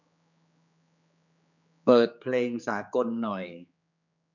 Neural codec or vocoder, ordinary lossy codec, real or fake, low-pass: codec, 16 kHz, 4 kbps, X-Codec, HuBERT features, trained on general audio; none; fake; 7.2 kHz